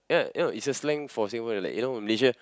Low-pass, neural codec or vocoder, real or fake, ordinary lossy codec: none; none; real; none